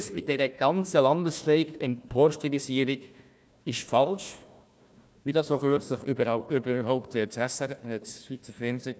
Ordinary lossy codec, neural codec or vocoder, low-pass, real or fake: none; codec, 16 kHz, 1 kbps, FunCodec, trained on Chinese and English, 50 frames a second; none; fake